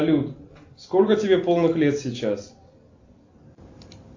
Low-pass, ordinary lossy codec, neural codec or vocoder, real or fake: 7.2 kHz; AAC, 48 kbps; none; real